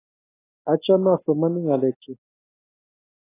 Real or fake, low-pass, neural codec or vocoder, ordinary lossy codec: real; 3.6 kHz; none; AAC, 16 kbps